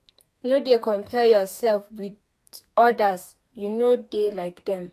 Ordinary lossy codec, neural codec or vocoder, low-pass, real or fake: AAC, 96 kbps; codec, 32 kHz, 1.9 kbps, SNAC; 14.4 kHz; fake